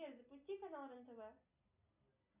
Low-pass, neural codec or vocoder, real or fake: 3.6 kHz; none; real